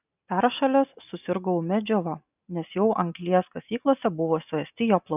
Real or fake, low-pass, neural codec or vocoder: real; 3.6 kHz; none